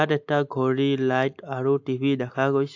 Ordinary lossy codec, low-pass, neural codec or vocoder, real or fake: AAC, 48 kbps; 7.2 kHz; none; real